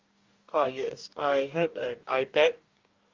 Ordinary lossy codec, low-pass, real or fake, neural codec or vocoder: Opus, 32 kbps; 7.2 kHz; fake; codec, 44.1 kHz, 2.6 kbps, DAC